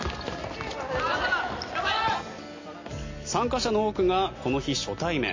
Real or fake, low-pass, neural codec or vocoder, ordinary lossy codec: real; 7.2 kHz; none; MP3, 32 kbps